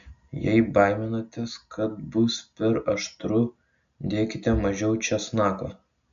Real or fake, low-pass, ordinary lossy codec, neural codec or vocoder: real; 7.2 kHz; AAC, 96 kbps; none